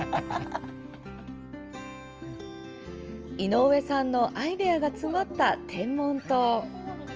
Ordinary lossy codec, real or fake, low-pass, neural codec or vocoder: Opus, 24 kbps; real; 7.2 kHz; none